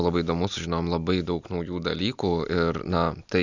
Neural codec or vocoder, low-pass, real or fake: none; 7.2 kHz; real